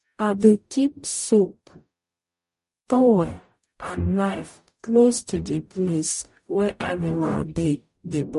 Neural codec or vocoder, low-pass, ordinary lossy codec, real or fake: codec, 44.1 kHz, 0.9 kbps, DAC; 14.4 kHz; MP3, 48 kbps; fake